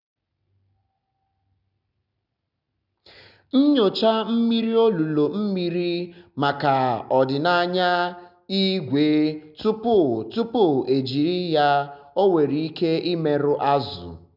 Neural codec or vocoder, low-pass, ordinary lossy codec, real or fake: none; 5.4 kHz; none; real